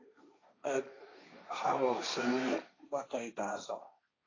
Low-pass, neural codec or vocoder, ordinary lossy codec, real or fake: 7.2 kHz; codec, 16 kHz, 1.1 kbps, Voila-Tokenizer; AAC, 32 kbps; fake